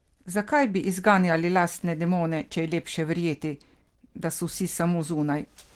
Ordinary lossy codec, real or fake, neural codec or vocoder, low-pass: Opus, 16 kbps; real; none; 19.8 kHz